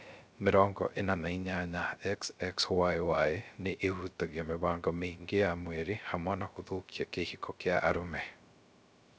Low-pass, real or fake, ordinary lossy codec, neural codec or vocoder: none; fake; none; codec, 16 kHz, 0.3 kbps, FocalCodec